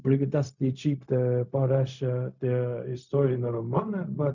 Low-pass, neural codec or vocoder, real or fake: 7.2 kHz; codec, 16 kHz, 0.4 kbps, LongCat-Audio-Codec; fake